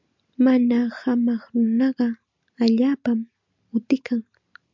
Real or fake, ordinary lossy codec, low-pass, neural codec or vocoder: real; MP3, 64 kbps; 7.2 kHz; none